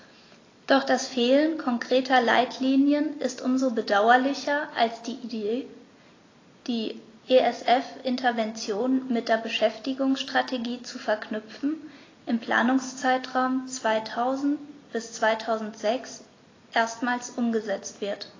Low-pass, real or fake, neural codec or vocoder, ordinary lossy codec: 7.2 kHz; real; none; AAC, 32 kbps